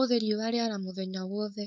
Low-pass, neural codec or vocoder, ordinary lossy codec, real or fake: none; codec, 16 kHz, 4.8 kbps, FACodec; none; fake